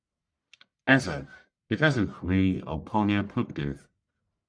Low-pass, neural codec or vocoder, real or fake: 9.9 kHz; codec, 44.1 kHz, 1.7 kbps, Pupu-Codec; fake